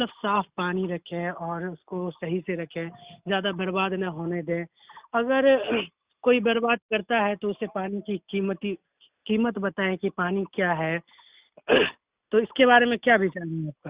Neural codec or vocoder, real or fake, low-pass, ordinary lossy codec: none; real; 3.6 kHz; Opus, 64 kbps